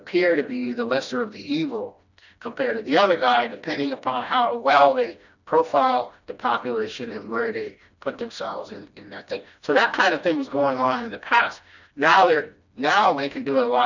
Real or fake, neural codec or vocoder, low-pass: fake; codec, 16 kHz, 1 kbps, FreqCodec, smaller model; 7.2 kHz